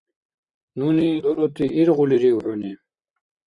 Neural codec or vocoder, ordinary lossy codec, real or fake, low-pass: vocoder, 44.1 kHz, 128 mel bands, Pupu-Vocoder; Opus, 64 kbps; fake; 10.8 kHz